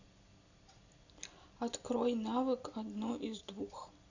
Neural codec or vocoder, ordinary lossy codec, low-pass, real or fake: none; Opus, 64 kbps; 7.2 kHz; real